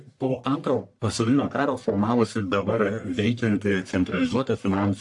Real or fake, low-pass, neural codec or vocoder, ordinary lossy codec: fake; 10.8 kHz; codec, 44.1 kHz, 1.7 kbps, Pupu-Codec; AAC, 64 kbps